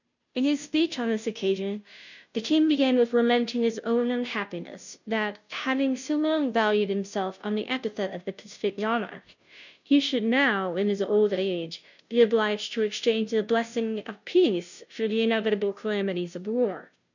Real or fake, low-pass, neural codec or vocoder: fake; 7.2 kHz; codec, 16 kHz, 0.5 kbps, FunCodec, trained on Chinese and English, 25 frames a second